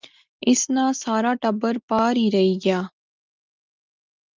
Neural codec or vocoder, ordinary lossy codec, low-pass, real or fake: none; Opus, 24 kbps; 7.2 kHz; real